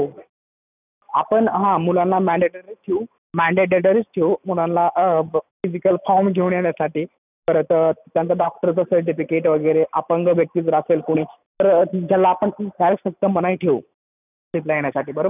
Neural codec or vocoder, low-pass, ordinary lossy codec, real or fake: none; 3.6 kHz; none; real